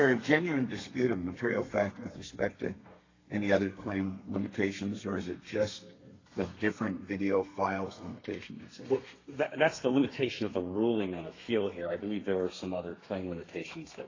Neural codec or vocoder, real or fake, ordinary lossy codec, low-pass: codec, 32 kHz, 1.9 kbps, SNAC; fake; AAC, 32 kbps; 7.2 kHz